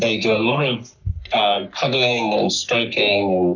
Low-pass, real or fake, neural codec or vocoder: 7.2 kHz; fake; codec, 44.1 kHz, 3.4 kbps, Pupu-Codec